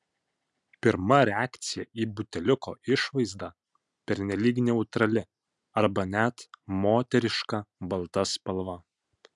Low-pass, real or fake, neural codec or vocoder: 10.8 kHz; real; none